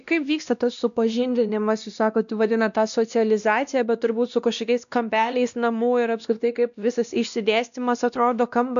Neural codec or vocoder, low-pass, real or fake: codec, 16 kHz, 1 kbps, X-Codec, WavLM features, trained on Multilingual LibriSpeech; 7.2 kHz; fake